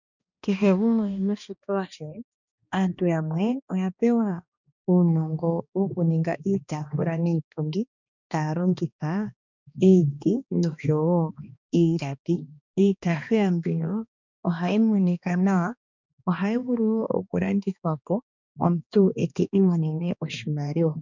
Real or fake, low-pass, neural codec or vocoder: fake; 7.2 kHz; codec, 16 kHz, 2 kbps, X-Codec, HuBERT features, trained on balanced general audio